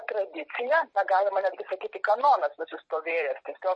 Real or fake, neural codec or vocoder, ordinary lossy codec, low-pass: fake; vocoder, 44.1 kHz, 128 mel bands every 256 samples, BigVGAN v2; MP3, 32 kbps; 9.9 kHz